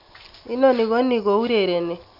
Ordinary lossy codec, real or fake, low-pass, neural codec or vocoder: none; real; 5.4 kHz; none